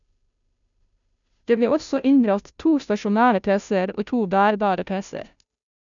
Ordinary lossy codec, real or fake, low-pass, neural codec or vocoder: none; fake; 7.2 kHz; codec, 16 kHz, 0.5 kbps, FunCodec, trained on Chinese and English, 25 frames a second